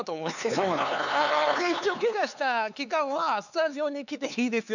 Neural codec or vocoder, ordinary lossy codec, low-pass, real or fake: codec, 16 kHz, 4 kbps, X-Codec, HuBERT features, trained on LibriSpeech; none; 7.2 kHz; fake